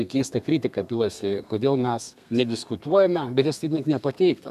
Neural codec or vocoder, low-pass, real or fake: codec, 32 kHz, 1.9 kbps, SNAC; 14.4 kHz; fake